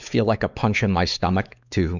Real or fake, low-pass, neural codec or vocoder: fake; 7.2 kHz; codec, 16 kHz, 4 kbps, X-Codec, WavLM features, trained on Multilingual LibriSpeech